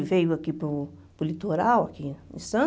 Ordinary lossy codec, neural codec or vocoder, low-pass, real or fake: none; none; none; real